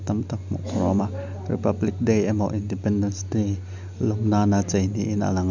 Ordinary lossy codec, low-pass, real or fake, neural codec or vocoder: none; 7.2 kHz; real; none